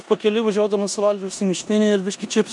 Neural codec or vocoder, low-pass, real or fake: codec, 16 kHz in and 24 kHz out, 0.9 kbps, LongCat-Audio-Codec, four codebook decoder; 10.8 kHz; fake